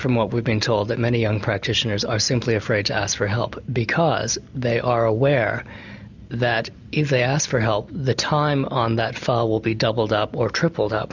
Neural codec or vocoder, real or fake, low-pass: none; real; 7.2 kHz